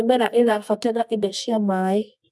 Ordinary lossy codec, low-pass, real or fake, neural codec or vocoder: none; none; fake; codec, 24 kHz, 0.9 kbps, WavTokenizer, medium music audio release